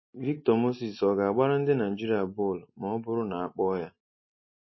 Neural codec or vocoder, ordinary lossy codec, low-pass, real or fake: none; MP3, 24 kbps; 7.2 kHz; real